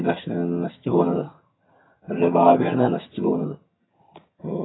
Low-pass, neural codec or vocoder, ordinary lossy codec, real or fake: 7.2 kHz; vocoder, 22.05 kHz, 80 mel bands, HiFi-GAN; AAC, 16 kbps; fake